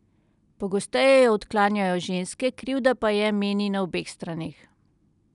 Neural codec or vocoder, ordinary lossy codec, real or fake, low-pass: none; none; real; 10.8 kHz